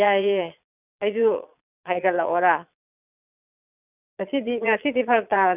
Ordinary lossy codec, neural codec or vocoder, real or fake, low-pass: none; vocoder, 22.05 kHz, 80 mel bands, Vocos; fake; 3.6 kHz